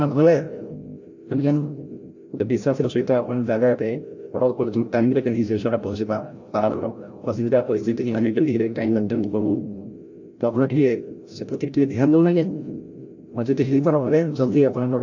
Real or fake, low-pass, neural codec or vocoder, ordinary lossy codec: fake; 7.2 kHz; codec, 16 kHz, 0.5 kbps, FreqCodec, larger model; AAC, 48 kbps